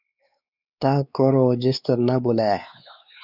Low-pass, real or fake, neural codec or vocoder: 5.4 kHz; fake; codec, 16 kHz, 4 kbps, X-Codec, WavLM features, trained on Multilingual LibriSpeech